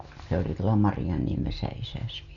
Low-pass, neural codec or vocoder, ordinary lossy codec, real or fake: 7.2 kHz; none; none; real